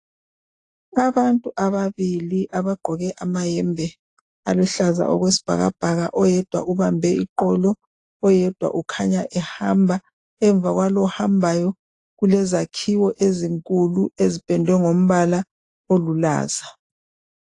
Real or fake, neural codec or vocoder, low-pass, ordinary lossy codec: real; none; 10.8 kHz; AAC, 48 kbps